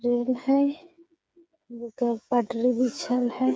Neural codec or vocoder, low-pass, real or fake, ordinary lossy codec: codec, 16 kHz, 8 kbps, FreqCodec, smaller model; none; fake; none